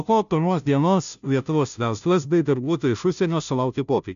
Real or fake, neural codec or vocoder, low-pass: fake; codec, 16 kHz, 0.5 kbps, FunCodec, trained on Chinese and English, 25 frames a second; 7.2 kHz